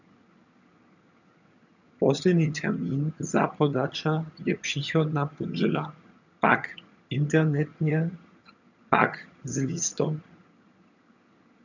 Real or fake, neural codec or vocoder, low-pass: fake; vocoder, 22.05 kHz, 80 mel bands, HiFi-GAN; 7.2 kHz